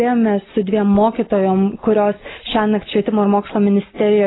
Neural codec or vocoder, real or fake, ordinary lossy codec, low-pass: none; real; AAC, 16 kbps; 7.2 kHz